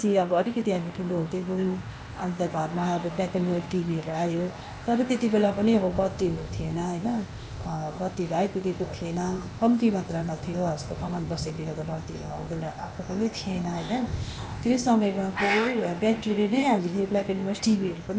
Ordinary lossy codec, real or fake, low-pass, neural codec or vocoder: none; fake; none; codec, 16 kHz, 0.8 kbps, ZipCodec